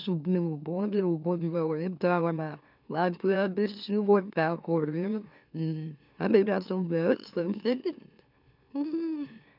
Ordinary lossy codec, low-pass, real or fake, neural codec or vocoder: none; 5.4 kHz; fake; autoencoder, 44.1 kHz, a latent of 192 numbers a frame, MeloTTS